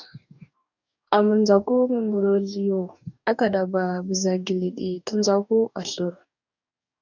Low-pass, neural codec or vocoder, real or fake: 7.2 kHz; codec, 44.1 kHz, 2.6 kbps, DAC; fake